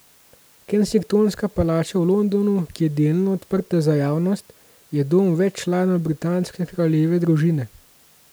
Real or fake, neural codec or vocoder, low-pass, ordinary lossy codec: real; none; none; none